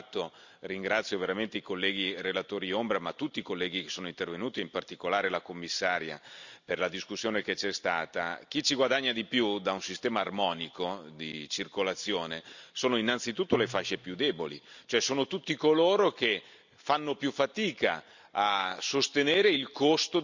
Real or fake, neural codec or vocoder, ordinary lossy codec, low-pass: real; none; none; 7.2 kHz